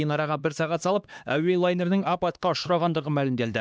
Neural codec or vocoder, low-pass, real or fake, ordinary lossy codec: codec, 16 kHz, 2 kbps, X-Codec, HuBERT features, trained on LibriSpeech; none; fake; none